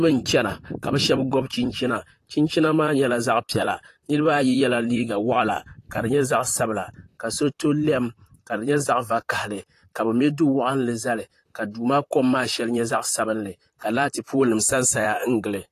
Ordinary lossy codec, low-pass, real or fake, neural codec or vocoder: AAC, 48 kbps; 14.4 kHz; fake; vocoder, 44.1 kHz, 128 mel bands, Pupu-Vocoder